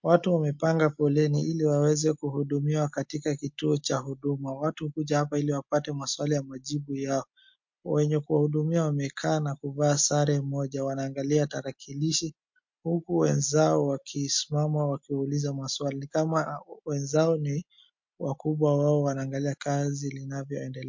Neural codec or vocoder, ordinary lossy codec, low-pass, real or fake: none; MP3, 48 kbps; 7.2 kHz; real